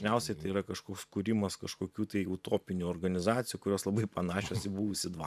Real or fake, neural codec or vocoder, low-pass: fake; vocoder, 44.1 kHz, 128 mel bands every 512 samples, BigVGAN v2; 14.4 kHz